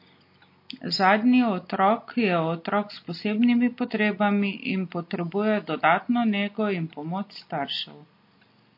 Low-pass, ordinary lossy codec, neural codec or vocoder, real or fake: 5.4 kHz; MP3, 32 kbps; none; real